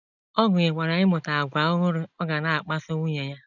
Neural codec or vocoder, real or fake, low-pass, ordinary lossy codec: none; real; 7.2 kHz; none